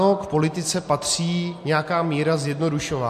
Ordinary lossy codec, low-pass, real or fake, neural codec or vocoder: MP3, 64 kbps; 14.4 kHz; real; none